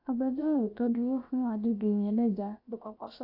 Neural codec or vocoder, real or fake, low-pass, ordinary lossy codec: codec, 16 kHz, about 1 kbps, DyCAST, with the encoder's durations; fake; 5.4 kHz; AAC, 32 kbps